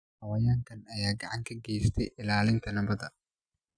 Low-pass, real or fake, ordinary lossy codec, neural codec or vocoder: 9.9 kHz; real; none; none